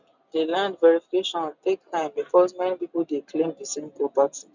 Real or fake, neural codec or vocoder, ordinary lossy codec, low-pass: real; none; none; 7.2 kHz